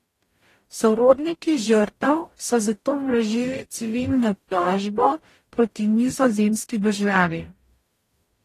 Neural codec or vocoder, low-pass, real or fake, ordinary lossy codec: codec, 44.1 kHz, 0.9 kbps, DAC; 14.4 kHz; fake; AAC, 48 kbps